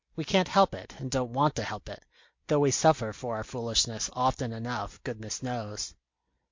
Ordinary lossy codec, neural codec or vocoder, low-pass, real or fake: MP3, 48 kbps; none; 7.2 kHz; real